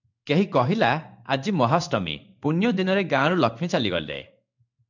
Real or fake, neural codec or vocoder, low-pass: fake; codec, 16 kHz in and 24 kHz out, 1 kbps, XY-Tokenizer; 7.2 kHz